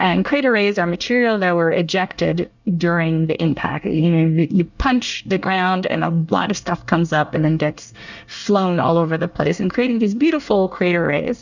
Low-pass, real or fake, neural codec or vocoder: 7.2 kHz; fake; codec, 24 kHz, 1 kbps, SNAC